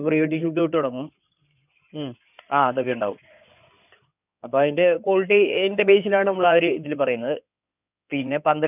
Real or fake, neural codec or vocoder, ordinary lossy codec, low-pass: fake; codec, 16 kHz in and 24 kHz out, 2.2 kbps, FireRedTTS-2 codec; none; 3.6 kHz